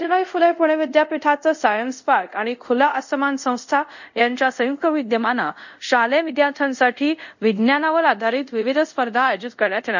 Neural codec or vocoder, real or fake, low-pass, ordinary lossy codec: codec, 24 kHz, 0.5 kbps, DualCodec; fake; 7.2 kHz; none